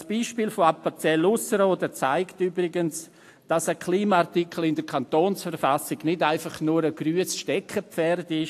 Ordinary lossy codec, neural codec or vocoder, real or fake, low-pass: AAC, 64 kbps; none; real; 14.4 kHz